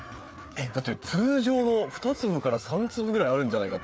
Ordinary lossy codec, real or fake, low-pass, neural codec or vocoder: none; fake; none; codec, 16 kHz, 4 kbps, FreqCodec, larger model